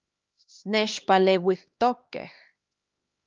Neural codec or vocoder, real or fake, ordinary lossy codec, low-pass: codec, 16 kHz, 2 kbps, X-Codec, HuBERT features, trained on LibriSpeech; fake; Opus, 32 kbps; 7.2 kHz